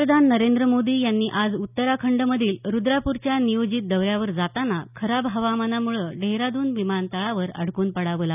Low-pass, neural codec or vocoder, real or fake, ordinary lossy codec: 3.6 kHz; none; real; none